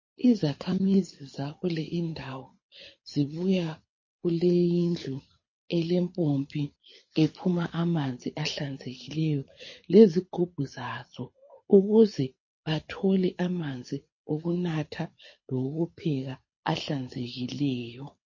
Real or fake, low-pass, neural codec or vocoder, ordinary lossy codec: fake; 7.2 kHz; codec, 24 kHz, 6 kbps, HILCodec; MP3, 32 kbps